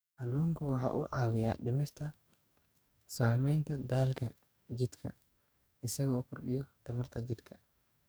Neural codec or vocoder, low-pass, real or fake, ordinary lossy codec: codec, 44.1 kHz, 2.6 kbps, DAC; none; fake; none